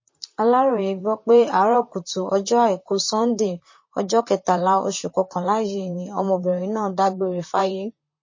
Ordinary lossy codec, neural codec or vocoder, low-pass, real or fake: MP3, 32 kbps; vocoder, 44.1 kHz, 128 mel bands, Pupu-Vocoder; 7.2 kHz; fake